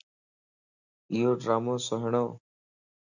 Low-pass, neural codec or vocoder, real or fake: 7.2 kHz; none; real